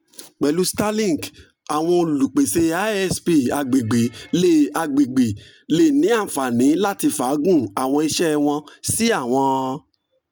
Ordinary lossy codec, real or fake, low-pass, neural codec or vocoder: none; real; none; none